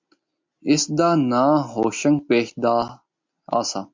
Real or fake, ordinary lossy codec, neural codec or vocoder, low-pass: real; MP3, 48 kbps; none; 7.2 kHz